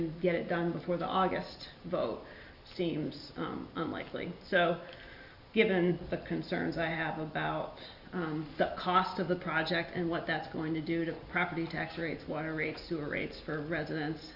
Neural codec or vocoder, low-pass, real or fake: none; 5.4 kHz; real